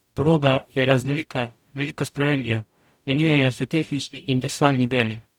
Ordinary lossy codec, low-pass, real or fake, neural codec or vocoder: none; 19.8 kHz; fake; codec, 44.1 kHz, 0.9 kbps, DAC